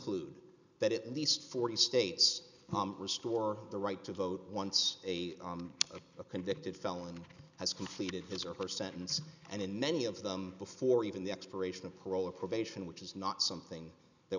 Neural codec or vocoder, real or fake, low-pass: none; real; 7.2 kHz